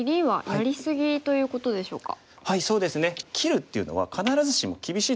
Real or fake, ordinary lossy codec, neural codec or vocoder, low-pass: real; none; none; none